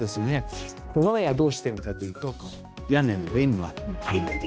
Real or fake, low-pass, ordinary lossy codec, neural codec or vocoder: fake; none; none; codec, 16 kHz, 1 kbps, X-Codec, HuBERT features, trained on balanced general audio